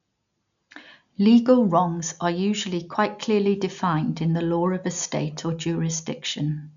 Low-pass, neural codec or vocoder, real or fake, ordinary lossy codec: 7.2 kHz; none; real; none